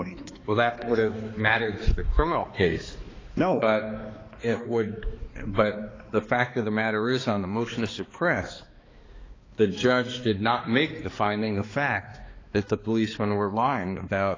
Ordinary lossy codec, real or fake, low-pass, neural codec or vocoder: AAC, 32 kbps; fake; 7.2 kHz; codec, 16 kHz, 2 kbps, X-Codec, HuBERT features, trained on balanced general audio